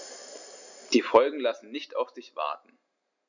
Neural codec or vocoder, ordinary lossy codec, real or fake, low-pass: none; none; real; 7.2 kHz